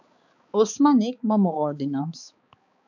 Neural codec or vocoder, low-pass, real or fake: codec, 16 kHz, 4 kbps, X-Codec, HuBERT features, trained on balanced general audio; 7.2 kHz; fake